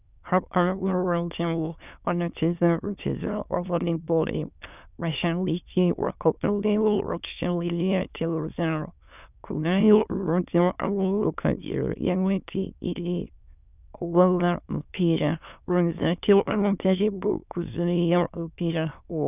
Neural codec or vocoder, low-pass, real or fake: autoencoder, 22.05 kHz, a latent of 192 numbers a frame, VITS, trained on many speakers; 3.6 kHz; fake